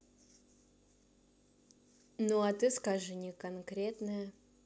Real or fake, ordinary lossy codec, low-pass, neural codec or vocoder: real; none; none; none